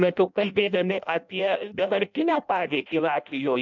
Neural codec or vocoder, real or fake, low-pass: codec, 16 kHz in and 24 kHz out, 0.6 kbps, FireRedTTS-2 codec; fake; 7.2 kHz